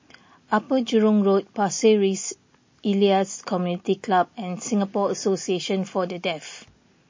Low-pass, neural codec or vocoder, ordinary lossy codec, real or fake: 7.2 kHz; none; MP3, 32 kbps; real